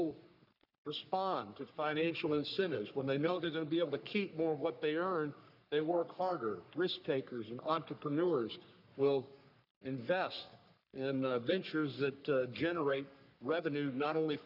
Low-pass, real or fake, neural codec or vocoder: 5.4 kHz; fake; codec, 44.1 kHz, 3.4 kbps, Pupu-Codec